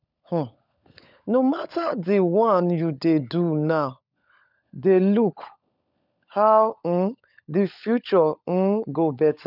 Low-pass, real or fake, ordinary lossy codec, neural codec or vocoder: 5.4 kHz; fake; none; codec, 16 kHz, 16 kbps, FunCodec, trained on LibriTTS, 50 frames a second